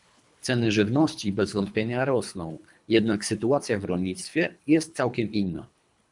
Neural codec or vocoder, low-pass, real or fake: codec, 24 kHz, 3 kbps, HILCodec; 10.8 kHz; fake